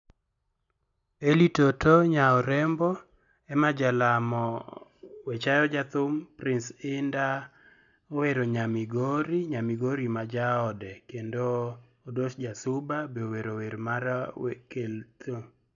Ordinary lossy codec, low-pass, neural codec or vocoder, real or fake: none; 7.2 kHz; none; real